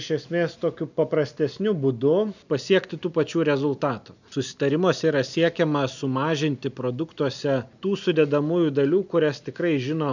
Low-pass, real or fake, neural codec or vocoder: 7.2 kHz; real; none